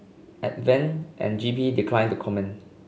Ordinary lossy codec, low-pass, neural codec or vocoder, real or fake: none; none; none; real